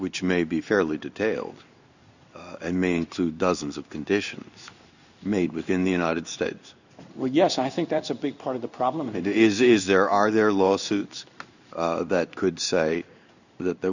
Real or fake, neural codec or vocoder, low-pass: fake; codec, 16 kHz in and 24 kHz out, 1 kbps, XY-Tokenizer; 7.2 kHz